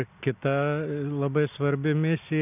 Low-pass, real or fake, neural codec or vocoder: 3.6 kHz; real; none